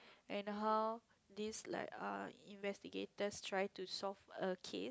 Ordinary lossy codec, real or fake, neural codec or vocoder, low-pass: none; real; none; none